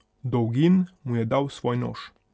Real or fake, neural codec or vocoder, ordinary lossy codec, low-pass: real; none; none; none